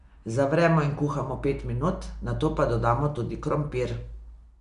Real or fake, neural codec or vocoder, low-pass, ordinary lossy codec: real; none; 10.8 kHz; none